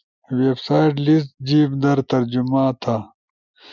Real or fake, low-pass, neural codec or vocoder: real; 7.2 kHz; none